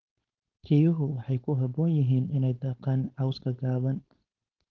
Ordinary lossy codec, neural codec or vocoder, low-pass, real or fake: Opus, 16 kbps; codec, 16 kHz, 4.8 kbps, FACodec; 7.2 kHz; fake